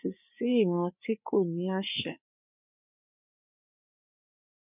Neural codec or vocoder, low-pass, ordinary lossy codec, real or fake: codec, 16 kHz, 4 kbps, FreqCodec, larger model; 3.6 kHz; none; fake